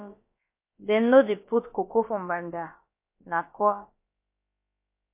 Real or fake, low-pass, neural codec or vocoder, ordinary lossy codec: fake; 3.6 kHz; codec, 16 kHz, about 1 kbps, DyCAST, with the encoder's durations; MP3, 24 kbps